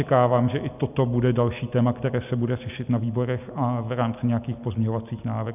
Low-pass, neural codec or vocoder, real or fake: 3.6 kHz; none; real